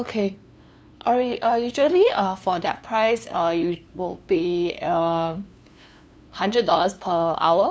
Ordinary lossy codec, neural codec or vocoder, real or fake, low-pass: none; codec, 16 kHz, 2 kbps, FunCodec, trained on LibriTTS, 25 frames a second; fake; none